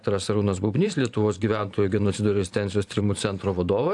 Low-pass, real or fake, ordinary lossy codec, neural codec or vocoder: 10.8 kHz; fake; AAC, 64 kbps; vocoder, 44.1 kHz, 128 mel bands every 512 samples, BigVGAN v2